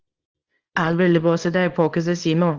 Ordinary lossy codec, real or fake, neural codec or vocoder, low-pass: Opus, 16 kbps; fake; codec, 24 kHz, 0.9 kbps, WavTokenizer, small release; 7.2 kHz